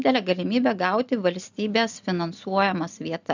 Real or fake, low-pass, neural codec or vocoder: real; 7.2 kHz; none